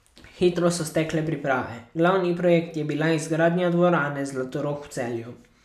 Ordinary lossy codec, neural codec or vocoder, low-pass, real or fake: none; none; 14.4 kHz; real